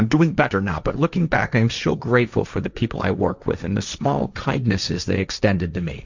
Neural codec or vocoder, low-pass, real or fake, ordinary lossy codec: codec, 16 kHz, 1.1 kbps, Voila-Tokenizer; 7.2 kHz; fake; Opus, 64 kbps